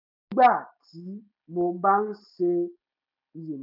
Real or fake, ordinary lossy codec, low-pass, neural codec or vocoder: real; none; 5.4 kHz; none